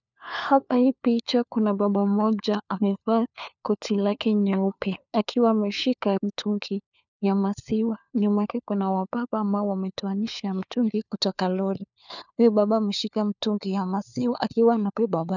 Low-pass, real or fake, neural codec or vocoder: 7.2 kHz; fake; codec, 16 kHz, 4 kbps, FunCodec, trained on LibriTTS, 50 frames a second